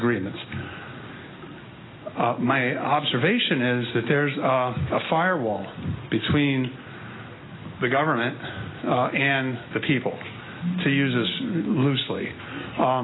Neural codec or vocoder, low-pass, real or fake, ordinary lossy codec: none; 7.2 kHz; real; AAC, 16 kbps